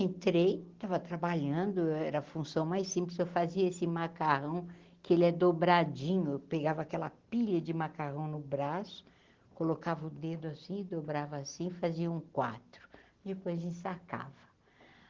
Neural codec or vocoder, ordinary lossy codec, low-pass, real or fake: none; Opus, 16 kbps; 7.2 kHz; real